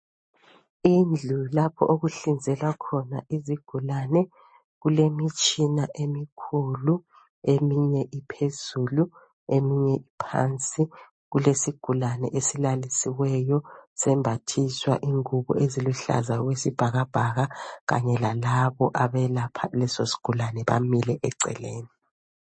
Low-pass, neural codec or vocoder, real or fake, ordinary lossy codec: 9.9 kHz; none; real; MP3, 32 kbps